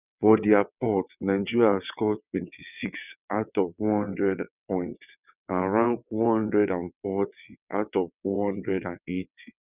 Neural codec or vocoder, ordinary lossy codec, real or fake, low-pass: vocoder, 22.05 kHz, 80 mel bands, WaveNeXt; none; fake; 3.6 kHz